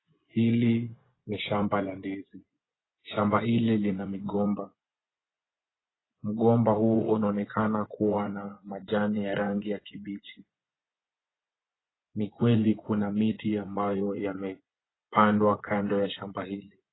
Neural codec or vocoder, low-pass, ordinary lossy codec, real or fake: vocoder, 24 kHz, 100 mel bands, Vocos; 7.2 kHz; AAC, 16 kbps; fake